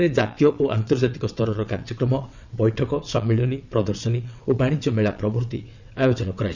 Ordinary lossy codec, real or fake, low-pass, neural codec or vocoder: none; fake; 7.2 kHz; vocoder, 22.05 kHz, 80 mel bands, WaveNeXt